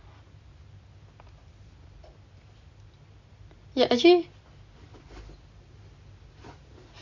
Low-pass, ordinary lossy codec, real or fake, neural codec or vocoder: 7.2 kHz; Opus, 64 kbps; real; none